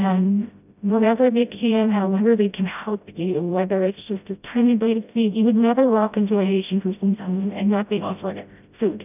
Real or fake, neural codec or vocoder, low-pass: fake; codec, 16 kHz, 0.5 kbps, FreqCodec, smaller model; 3.6 kHz